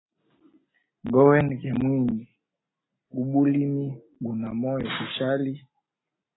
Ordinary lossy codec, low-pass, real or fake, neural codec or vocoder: AAC, 16 kbps; 7.2 kHz; real; none